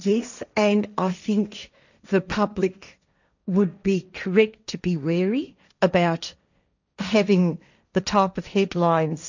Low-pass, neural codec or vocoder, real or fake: 7.2 kHz; codec, 16 kHz, 1.1 kbps, Voila-Tokenizer; fake